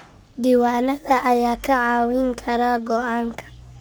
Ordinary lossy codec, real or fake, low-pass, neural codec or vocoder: none; fake; none; codec, 44.1 kHz, 3.4 kbps, Pupu-Codec